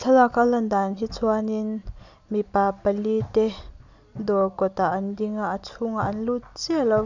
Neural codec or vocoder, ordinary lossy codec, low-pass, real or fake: none; none; 7.2 kHz; real